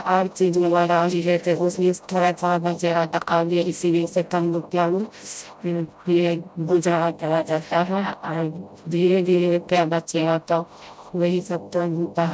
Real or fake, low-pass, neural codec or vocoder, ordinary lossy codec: fake; none; codec, 16 kHz, 0.5 kbps, FreqCodec, smaller model; none